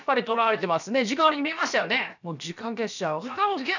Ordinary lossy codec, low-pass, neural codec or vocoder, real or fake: none; 7.2 kHz; codec, 16 kHz, about 1 kbps, DyCAST, with the encoder's durations; fake